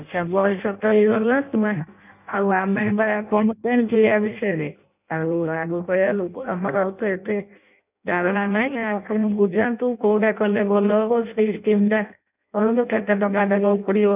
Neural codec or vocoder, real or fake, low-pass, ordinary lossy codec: codec, 16 kHz in and 24 kHz out, 0.6 kbps, FireRedTTS-2 codec; fake; 3.6 kHz; none